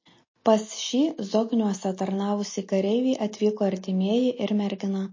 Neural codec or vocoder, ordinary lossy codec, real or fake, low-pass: none; MP3, 32 kbps; real; 7.2 kHz